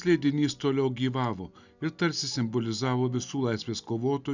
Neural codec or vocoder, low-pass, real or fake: none; 7.2 kHz; real